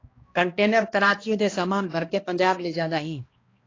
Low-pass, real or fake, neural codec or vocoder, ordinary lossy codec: 7.2 kHz; fake; codec, 16 kHz, 1 kbps, X-Codec, HuBERT features, trained on balanced general audio; AAC, 32 kbps